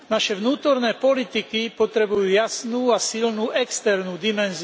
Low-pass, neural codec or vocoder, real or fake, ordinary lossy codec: none; none; real; none